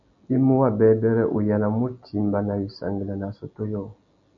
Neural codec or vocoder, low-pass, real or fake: none; 7.2 kHz; real